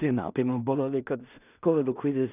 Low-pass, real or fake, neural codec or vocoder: 3.6 kHz; fake; codec, 16 kHz in and 24 kHz out, 0.4 kbps, LongCat-Audio-Codec, two codebook decoder